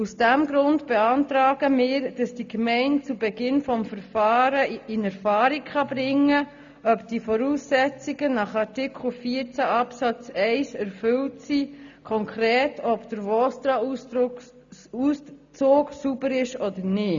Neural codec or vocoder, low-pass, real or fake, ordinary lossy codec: none; 7.2 kHz; real; AAC, 64 kbps